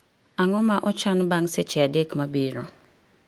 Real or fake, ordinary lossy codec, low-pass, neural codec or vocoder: fake; Opus, 24 kbps; 19.8 kHz; autoencoder, 48 kHz, 128 numbers a frame, DAC-VAE, trained on Japanese speech